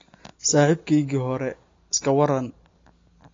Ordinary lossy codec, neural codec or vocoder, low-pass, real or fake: AAC, 32 kbps; none; 7.2 kHz; real